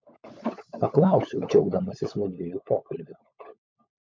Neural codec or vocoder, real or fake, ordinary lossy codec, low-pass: codec, 16 kHz, 16 kbps, FunCodec, trained on LibriTTS, 50 frames a second; fake; MP3, 48 kbps; 7.2 kHz